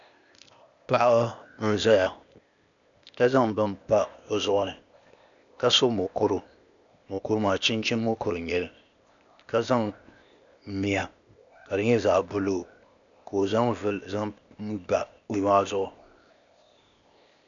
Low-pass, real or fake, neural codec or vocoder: 7.2 kHz; fake; codec, 16 kHz, 0.8 kbps, ZipCodec